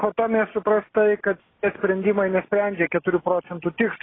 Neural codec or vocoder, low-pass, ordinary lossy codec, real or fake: none; 7.2 kHz; AAC, 16 kbps; real